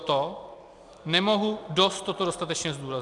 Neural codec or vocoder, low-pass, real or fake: none; 10.8 kHz; real